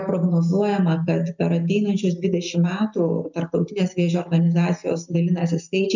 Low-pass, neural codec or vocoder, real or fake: 7.2 kHz; none; real